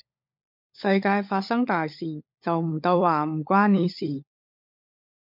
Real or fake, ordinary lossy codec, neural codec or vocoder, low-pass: fake; MP3, 48 kbps; codec, 16 kHz, 16 kbps, FunCodec, trained on LibriTTS, 50 frames a second; 5.4 kHz